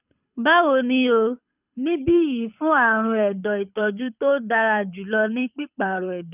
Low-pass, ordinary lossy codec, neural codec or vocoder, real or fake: 3.6 kHz; none; codec, 24 kHz, 6 kbps, HILCodec; fake